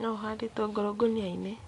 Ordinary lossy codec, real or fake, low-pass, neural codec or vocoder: AAC, 48 kbps; real; 10.8 kHz; none